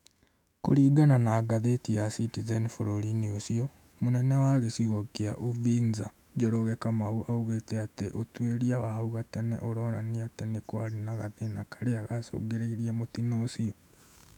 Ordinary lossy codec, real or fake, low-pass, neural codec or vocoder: none; fake; 19.8 kHz; autoencoder, 48 kHz, 128 numbers a frame, DAC-VAE, trained on Japanese speech